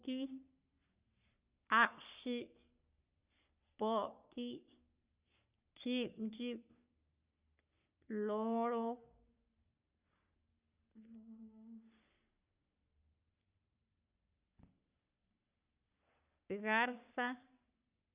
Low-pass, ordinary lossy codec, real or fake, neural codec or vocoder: 3.6 kHz; Opus, 64 kbps; fake; codec, 44.1 kHz, 3.4 kbps, Pupu-Codec